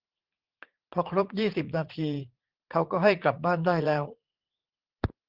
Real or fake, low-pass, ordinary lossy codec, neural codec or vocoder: fake; 5.4 kHz; Opus, 16 kbps; vocoder, 44.1 kHz, 80 mel bands, Vocos